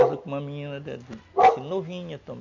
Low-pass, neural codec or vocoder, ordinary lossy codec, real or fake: 7.2 kHz; none; none; real